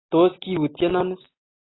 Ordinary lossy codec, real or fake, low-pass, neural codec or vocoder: AAC, 16 kbps; real; 7.2 kHz; none